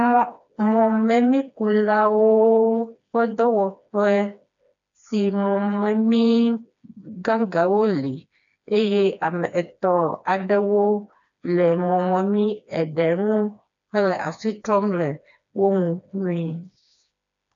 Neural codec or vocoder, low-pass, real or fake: codec, 16 kHz, 2 kbps, FreqCodec, smaller model; 7.2 kHz; fake